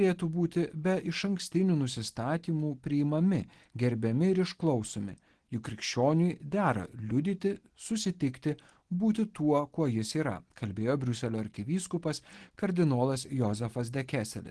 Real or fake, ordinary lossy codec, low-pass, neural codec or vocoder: real; Opus, 16 kbps; 10.8 kHz; none